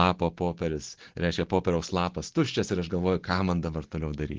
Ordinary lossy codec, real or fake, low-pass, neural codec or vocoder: Opus, 16 kbps; real; 7.2 kHz; none